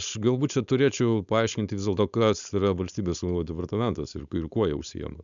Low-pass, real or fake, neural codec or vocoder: 7.2 kHz; fake; codec, 16 kHz, 4.8 kbps, FACodec